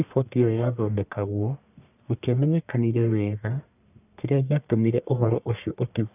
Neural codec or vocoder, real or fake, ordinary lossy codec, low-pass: codec, 44.1 kHz, 1.7 kbps, Pupu-Codec; fake; none; 3.6 kHz